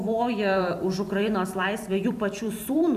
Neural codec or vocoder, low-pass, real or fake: vocoder, 44.1 kHz, 128 mel bands every 256 samples, BigVGAN v2; 14.4 kHz; fake